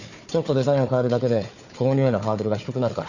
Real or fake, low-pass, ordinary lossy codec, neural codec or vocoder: fake; 7.2 kHz; none; codec, 16 kHz, 4 kbps, FunCodec, trained on Chinese and English, 50 frames a second